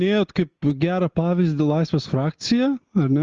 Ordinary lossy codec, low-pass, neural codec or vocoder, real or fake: Opus, 24 kbps; 7.2 kHz; none; real